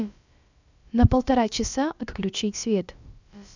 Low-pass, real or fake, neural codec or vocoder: 7.2 kHz; fake; codec, 16 kHz, about 1 kbps, DyCAST, with the encoder's durations